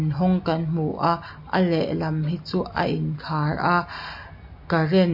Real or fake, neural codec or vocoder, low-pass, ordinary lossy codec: real; none; 5.4 kHz; MP3, 32 kbps